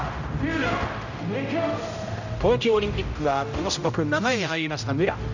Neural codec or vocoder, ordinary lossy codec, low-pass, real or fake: codec, 16 kHz, 0.5 kbps, X-Codec, HuBERT features, trained on general audio; none; 7.2 kHz; fake